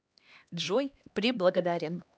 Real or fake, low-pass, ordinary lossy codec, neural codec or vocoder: fake; none; none; codec, 16 kHz, 2 kbps, X-Codec, HuBERT features, trained on LibriSpeech